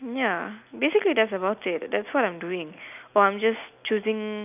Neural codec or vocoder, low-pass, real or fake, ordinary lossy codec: none; 3.6 kHz; real; none